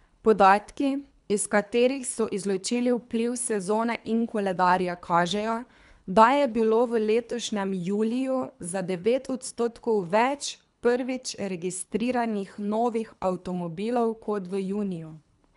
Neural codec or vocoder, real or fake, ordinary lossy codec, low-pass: codec, 24 kHz, 3 kbps, HILCodec; fake; none; 10.8 kHz